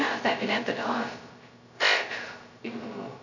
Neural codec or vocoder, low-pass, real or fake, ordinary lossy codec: codec, 16 kHz, 0.2 kbps, FocalCodec; 7.2 kHz; fake; none